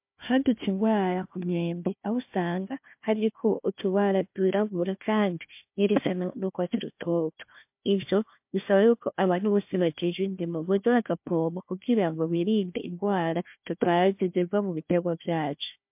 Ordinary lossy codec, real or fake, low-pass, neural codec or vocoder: MP3, 32 kbps; fake; 3.6 kHz; codec, 16 kHz, 1 kbps, FunCodec, trained on Chinese and English, 50 frames a second